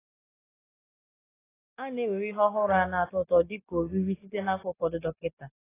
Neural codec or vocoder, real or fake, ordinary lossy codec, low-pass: none; real; AAC, 16 kbps; 3.6 kHz